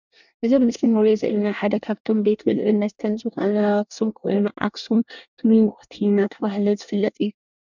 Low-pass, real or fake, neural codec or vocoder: 7.2 kHz; fake; codec, 24 kHz, 1 kbps, SNAC